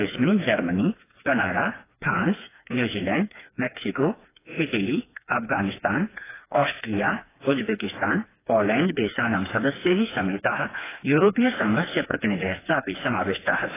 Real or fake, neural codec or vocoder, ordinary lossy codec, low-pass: fake; codec, 16 kHz, 2 kbps, FreqCodec, smaller model; AAC, 16 kbps; 3.6 kHz